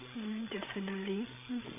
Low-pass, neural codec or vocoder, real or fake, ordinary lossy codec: 3.6 kHz; codec, 16 kHz, 16 kbps, FreqCodec, larger model; fake; none